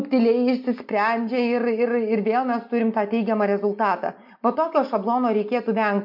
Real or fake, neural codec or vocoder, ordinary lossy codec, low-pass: real; none; MP3, 48 kbps; 5.4 kHz